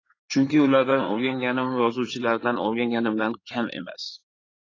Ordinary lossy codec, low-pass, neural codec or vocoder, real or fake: AAC, 32 kbps; 7.2 kHz; codec, 16 kHz in and 24 kHz out, 2.2 kbps, FireRedTTS-2 codec; fake